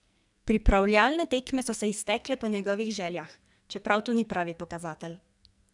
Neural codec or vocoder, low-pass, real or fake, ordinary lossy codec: codec, 44.1 kHz, 2.6 kbps, SNAC; 10.8 kHz; fake; none